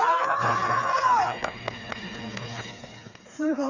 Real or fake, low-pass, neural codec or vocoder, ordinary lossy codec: fake; 7.2 kHz; codec, 16 kHz, 4 kbps, FreqCodec, smaller model; none